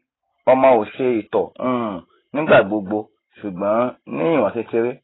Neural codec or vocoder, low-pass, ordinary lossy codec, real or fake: none; 7.2 kHz; AAC, 16 kbps; real